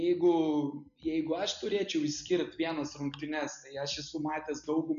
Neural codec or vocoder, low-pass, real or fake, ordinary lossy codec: none; 7.2 kHz; real; AAC, 96 kbps